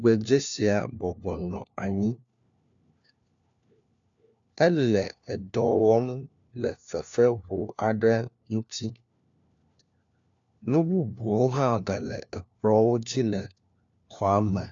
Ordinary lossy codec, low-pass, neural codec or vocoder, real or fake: AAC, 64 kbps; 7.2 kHz; codec, 16 kHz, 1 kbps, FunCodec, trained on LibriTTS, 50 frames a second; fake